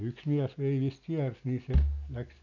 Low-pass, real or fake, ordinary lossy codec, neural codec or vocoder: 7.2 kHz; real; none; none